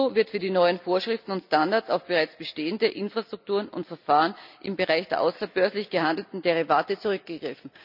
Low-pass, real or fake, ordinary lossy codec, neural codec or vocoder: 5.4 kHz; real; none; none